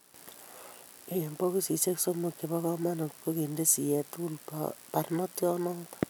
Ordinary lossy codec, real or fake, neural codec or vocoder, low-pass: none; real; none; none